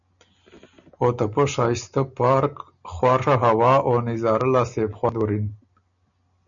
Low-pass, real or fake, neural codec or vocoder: 7.2 kHz; real; none